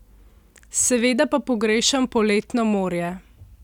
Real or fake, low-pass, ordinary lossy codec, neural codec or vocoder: real; 19.8 kHz; none; none